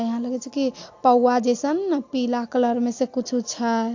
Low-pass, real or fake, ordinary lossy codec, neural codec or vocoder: 7.2 kHz; real; AAC, 48 kbps; none